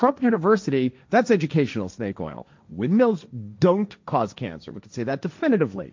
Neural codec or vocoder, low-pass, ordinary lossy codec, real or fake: codec, 16 kHz, 1.1 kbps, Voila-Tokenizer; 7.2 kHz; MP3, 64 kbps; fake